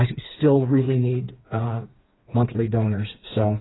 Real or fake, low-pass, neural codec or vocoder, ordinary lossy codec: fake; 7.2 kHz; codec, 16 kHz, 4 kbps, FreqCodec, smaller model; AAC, 16 kbps